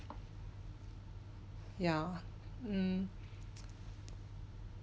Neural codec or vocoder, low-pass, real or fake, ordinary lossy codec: none; none; real; none